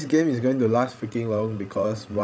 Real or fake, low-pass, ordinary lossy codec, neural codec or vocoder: fake; none; none; codec, 16 kHz, 16 kbps, FreqCodec, larger model